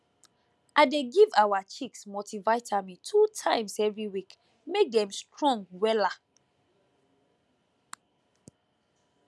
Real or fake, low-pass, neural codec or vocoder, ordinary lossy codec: real; none; none; none